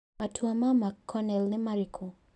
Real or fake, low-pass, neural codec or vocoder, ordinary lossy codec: real; 10.8 kHz; none; AAC, 64 kbps